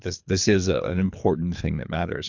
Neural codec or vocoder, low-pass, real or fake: codec, 24 kHz, 3 kbps, HILCodec; 7.2 kHz; fake